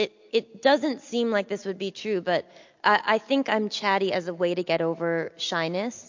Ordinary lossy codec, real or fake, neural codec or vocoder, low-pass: MP3, 48 kbps; real; none; 7.2 kHz